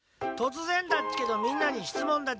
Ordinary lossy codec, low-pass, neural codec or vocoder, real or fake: none; none; none; real